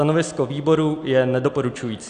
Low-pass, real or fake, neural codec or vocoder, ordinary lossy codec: 9.9 kHz; real; none; Opus, 64 kbps